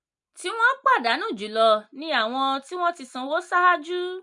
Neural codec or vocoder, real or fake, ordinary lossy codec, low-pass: none; real; MP3, 64 kbps; 10.8 kHz